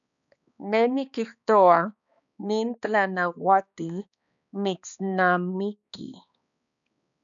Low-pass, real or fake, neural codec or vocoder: 7.2 kHz; fake; codec, 16 kHz, 2 kbps, X-Codec, HuBERT features, trained on balanced general audio